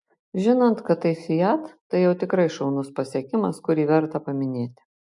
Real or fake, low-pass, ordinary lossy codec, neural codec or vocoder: real; 10.8 kHz; MP3, 64 kbps; none